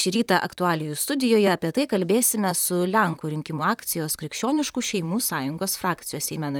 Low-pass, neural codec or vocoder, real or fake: 19.8 kHz; vocoder, 44.1 kHz, 128 mel bands, Pupu-Vocoder; fake